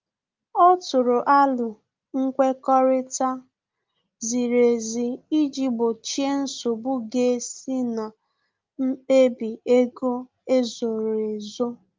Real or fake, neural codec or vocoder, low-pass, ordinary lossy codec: real; none; 7.2 kHz; Opus, 24 kbps